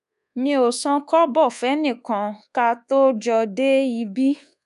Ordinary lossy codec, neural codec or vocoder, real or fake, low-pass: none; codec, 24 kHz, 1.2 kbps, DualCodec; fake; 10.8 kHz